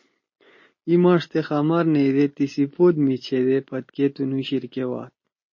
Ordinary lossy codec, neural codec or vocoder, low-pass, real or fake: MP3, 32 kbps; none; 7.2 kHz; real